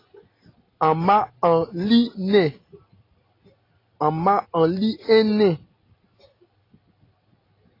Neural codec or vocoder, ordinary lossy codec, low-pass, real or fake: none; AAC, 24 kbps; 5.4 kHz; real